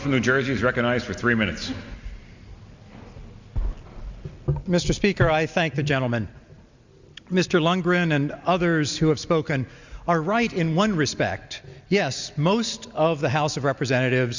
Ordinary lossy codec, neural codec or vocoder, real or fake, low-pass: Opus, 64 kbps; none; real; 7.2 kHz